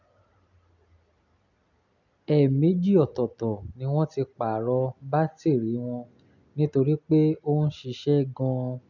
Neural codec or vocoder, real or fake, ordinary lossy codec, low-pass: none; real; none; 7.2 kHz